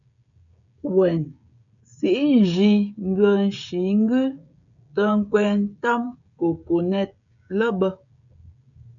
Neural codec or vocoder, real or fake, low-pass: codec, 16 kHz, 16 kbps, FreqCodec, smaller model; fake; 7.2 kHz